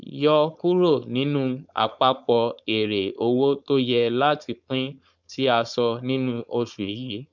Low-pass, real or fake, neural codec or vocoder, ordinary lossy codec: 7.2 kHz; fake; codec, 16 kHz, 4.8 kbps, FACodec; none